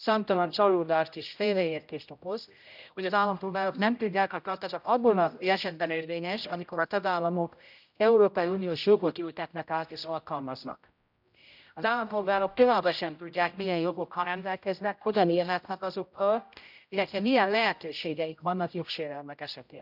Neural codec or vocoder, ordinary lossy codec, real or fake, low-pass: codec, 16 kHz, 0.5 kbps, X-Codec, HuBERT features, trained on general audio; none; fake; 5.4 kHz